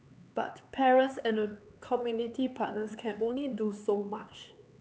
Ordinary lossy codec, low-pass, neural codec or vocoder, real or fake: none; none; codec, 16 kHz, 4 kbps, X-Codec, HuBERT features, trained on LibriSpeech; fake